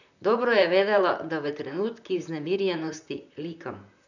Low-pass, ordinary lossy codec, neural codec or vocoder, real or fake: 7.2 kHz; none; vocoder, 44.1 kHz, 128 mel bands, Pupu-Vocoder; fake